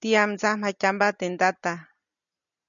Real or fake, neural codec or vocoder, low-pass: real; none; 7.2 kHz